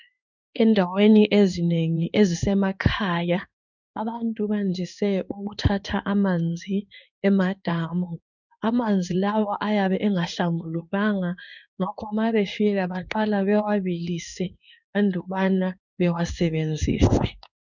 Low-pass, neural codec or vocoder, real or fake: 7.2 kHz; codec, 16 kHz in and 24 kHz out, 1 kbps, XY-Tokenizer; fake